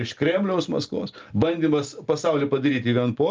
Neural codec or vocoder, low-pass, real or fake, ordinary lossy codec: none; 7.2 kHz; real; Opus, 24 kbps